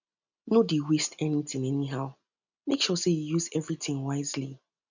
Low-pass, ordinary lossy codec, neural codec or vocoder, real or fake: 7.2 kHz; none; none; real